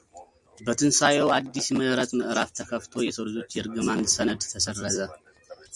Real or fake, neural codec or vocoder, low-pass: real; none; 10.8 kHz